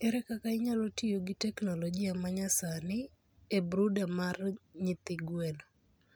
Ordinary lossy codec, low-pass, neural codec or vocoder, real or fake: none; none; none; real